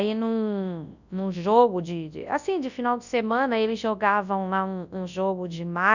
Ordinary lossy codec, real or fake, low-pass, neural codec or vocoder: none; fake; 7.2 kHz; codec, 24 kHz, 0.9 kbps, WavTokenizer, large speech release